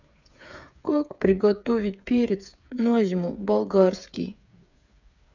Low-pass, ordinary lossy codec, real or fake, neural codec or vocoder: 7.2 kHz; none; fake; codec, 16 kHz, 8 kbps, FreqCodec, smaller model